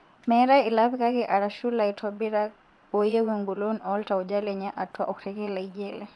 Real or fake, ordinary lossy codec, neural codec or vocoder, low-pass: fake; none; vocoder, 22.05 kHz, 80 mel bands, Vocos; none